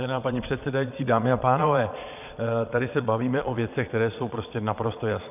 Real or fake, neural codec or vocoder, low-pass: fake; vocoder, 22.05 kHz, 80 mel bands, Vocos; 3.6 kHz